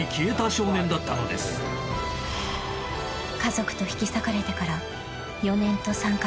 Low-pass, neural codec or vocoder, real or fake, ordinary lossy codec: none; none; real; none